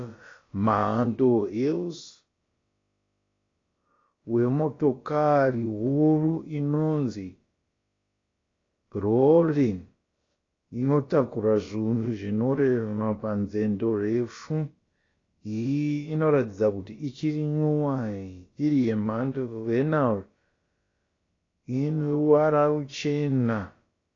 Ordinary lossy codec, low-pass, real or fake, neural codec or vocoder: AAC, 32 kbps; 7.2 kHz; fake; codec, 16 kHz, about 1 kbps, DyCAST, with the encoder's durations